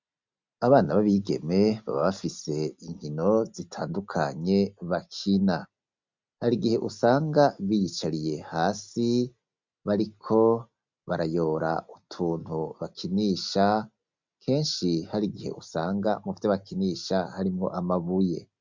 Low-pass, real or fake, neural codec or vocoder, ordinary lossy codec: 7.2 kHz; real; none; MP3, 64 kbps